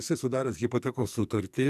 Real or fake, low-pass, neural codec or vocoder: fake; 14.4 kHz; codec, 44.1 kHz, 2.6 kbps, SNAC